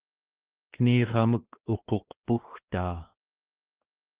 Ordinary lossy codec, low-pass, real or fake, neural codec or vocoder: Opus, 16 kbps; 3.6 kHz; fake; codec, 16 kHz, 2 kbps, X-Codec, WavLM features, trained on Multilingual LibriSpeech